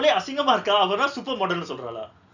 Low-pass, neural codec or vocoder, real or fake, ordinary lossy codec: 7.2 kHz; none; real; none